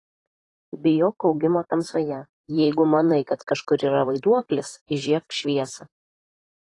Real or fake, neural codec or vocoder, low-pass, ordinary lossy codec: fake; vocoder, 44.1 kHz, 128 mel bands, Pupu-Vocoder; 10.8 kHz; AAC, 32 kbps